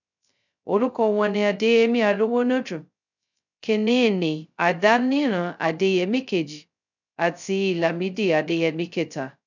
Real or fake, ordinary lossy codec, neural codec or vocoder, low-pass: fake; none; codec, 16 kHz, 0.2 kbps, FocalCodec; 7.2 kHz